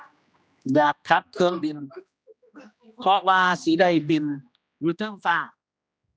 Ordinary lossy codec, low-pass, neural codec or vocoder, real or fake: none; none; codec, 16 kHz, 1 kbps, X-Codec, HuBERT features, trained on general audio; fake